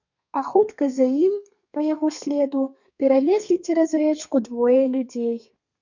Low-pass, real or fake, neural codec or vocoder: 7.2 kHz; fake; codec, 32 kHz, 1.9 kbps, SNAC